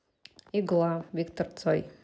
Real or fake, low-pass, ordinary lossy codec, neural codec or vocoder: real; none; none; none